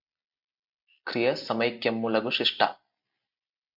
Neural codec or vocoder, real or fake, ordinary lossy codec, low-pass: none; real; MP3, 48 kbps; 5.4 kHz